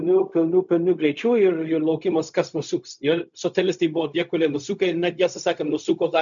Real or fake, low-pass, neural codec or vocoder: fake; 7.2 kHz; codec, 16 kHz, 0.4 kbps, LongCat-Audio-Codec